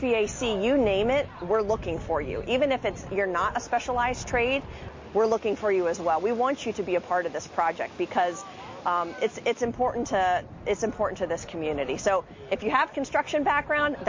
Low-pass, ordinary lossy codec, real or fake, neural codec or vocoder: 7.2 kHz; MP3, 32 kbps; real; none